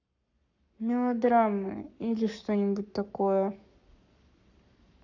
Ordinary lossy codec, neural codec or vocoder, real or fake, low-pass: none; codec, 44.1 kHz, 7.8 kbps, Pupu-Codec; fake; 7.2 kHz